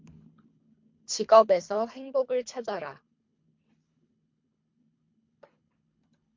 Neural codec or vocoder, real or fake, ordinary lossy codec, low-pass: codec, 24 kHz, 3 kbps, HILCodec; fake; MP3, 48 kbps; 7.2 kHz